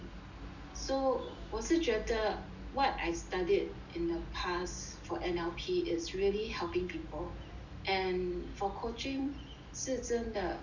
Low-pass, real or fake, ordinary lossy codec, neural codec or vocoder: 7.2 kHz; real; none; none